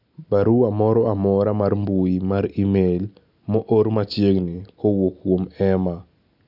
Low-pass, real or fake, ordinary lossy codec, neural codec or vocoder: 5.4 kHz; real; none; none